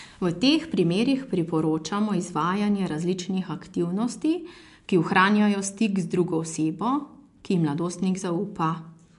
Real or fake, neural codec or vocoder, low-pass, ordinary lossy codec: real; none; 10.8 kHz; MP3, 64 kbps